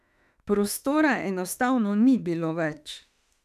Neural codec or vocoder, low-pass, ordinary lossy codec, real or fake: autoencoder, 48 kHz, 32 numbers a frame, DAC-VAE, trained on Japanese speech; 14.4 kHz; none; fake